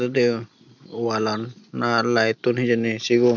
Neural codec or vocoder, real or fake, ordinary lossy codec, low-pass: none; real; none; 7.2 kHz